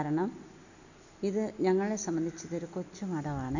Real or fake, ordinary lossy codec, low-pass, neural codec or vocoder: real; none; 7.2 kHz; none